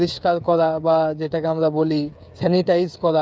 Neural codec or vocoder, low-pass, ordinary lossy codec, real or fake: codec, 16 kHz, 8 kbps, FreqCodec, smaller model; none; none; fake